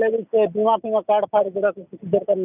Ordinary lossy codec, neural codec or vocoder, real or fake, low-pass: none; none; real; 3.6 kHz